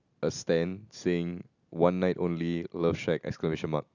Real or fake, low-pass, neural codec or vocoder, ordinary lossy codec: real; 7.2 kHz; none; none